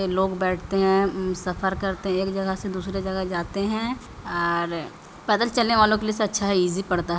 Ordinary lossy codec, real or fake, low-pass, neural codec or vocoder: none; real; none; none